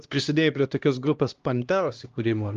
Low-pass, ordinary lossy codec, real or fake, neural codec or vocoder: 7.2 kHz; Opus, 32 kbps; fake; codec, 16 kHz, 1 kbps, X-Codec, HuBERT features, trained on LibriSpeech